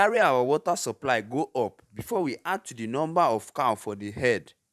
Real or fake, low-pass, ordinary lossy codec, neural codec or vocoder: fake; 14.4 kHz; none; vocoder, 44.1 kHz, 128 mel bands every 512 samples, BigVGAN v2